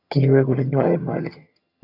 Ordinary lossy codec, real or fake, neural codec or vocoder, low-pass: AAC, 32 kbps; fake; vocoder, 22.05 kHz, 80 mel bands, HiFi-GAN; 5.4 kHz